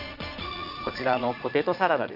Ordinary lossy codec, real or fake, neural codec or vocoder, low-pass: none; fake; vocoder, 22.05 kHz, 80 mel bands, Vocos; 5.4 kHz